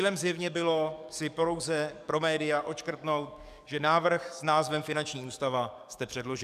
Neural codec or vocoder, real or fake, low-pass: codec, 44.1 kHz, 7.8 kbps, DAC; fake; 14.4 kHz